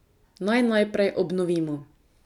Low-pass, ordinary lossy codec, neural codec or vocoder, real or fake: 19.8 kHz; none; none; real